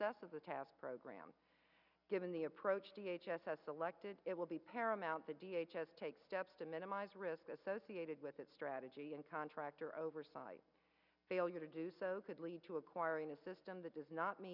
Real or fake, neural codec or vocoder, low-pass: real; none; 5.4 kHz